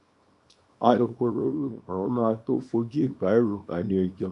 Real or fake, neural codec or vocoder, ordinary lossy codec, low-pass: fake; codec, 24 kHz, 0.9 kbps, WavTokenizer, small release; none; 10.8 kHz